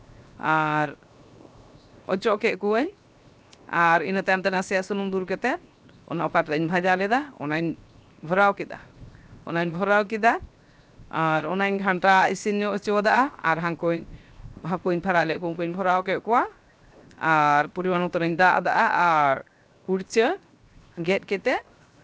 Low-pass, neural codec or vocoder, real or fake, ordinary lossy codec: none; codec, 16 kHz, 0.7 kbps, FocalCodec; fake; none